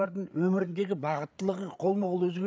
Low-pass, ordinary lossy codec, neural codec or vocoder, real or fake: none; none; codec, 16 kHz, 8 kbps, FreqCodec, larger model; fake